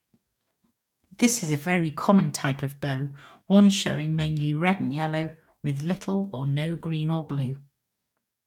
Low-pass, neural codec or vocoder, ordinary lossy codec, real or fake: 19.8 kHz; codec, 44.1 kHz, 2.6 kbps, DAC; MP3, 96 kbps; fake